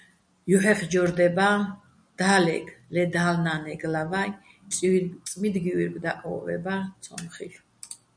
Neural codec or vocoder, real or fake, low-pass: none; real; 9.9 kHz